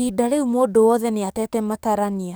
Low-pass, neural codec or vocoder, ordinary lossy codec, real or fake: none; codec, 44.1 kHz, 7.8 kbps, DAC; none; fake